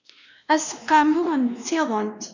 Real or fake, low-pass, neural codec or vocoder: fake; 7.2 kHz; codec, 16 kHz, 1 kbps, X-Codec, WavLM features, trained on Multilingual LibriSpeech